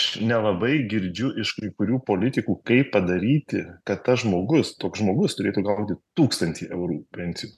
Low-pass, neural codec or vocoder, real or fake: 14.4 kHz; none; real